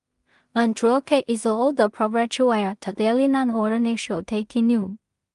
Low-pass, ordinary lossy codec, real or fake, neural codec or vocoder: 10.8 kHz; Opus, 32 kbps; fake; codec, 16 kHz in and 24 kHz out, 0.4 kbps, LongCat-Audio-Codec, two codebook decoder